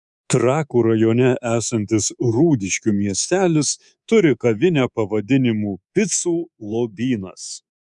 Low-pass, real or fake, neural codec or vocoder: 10.8 kHz; fake; codec, 24 kHz, 3.1 kbps, DualCodec